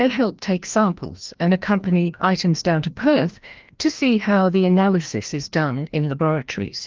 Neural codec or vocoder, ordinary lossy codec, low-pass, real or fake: codec, 16 kHz, 1 kbps, FreqCodec, larger model; Opus, 32 kbps; 7.2 kHz; fake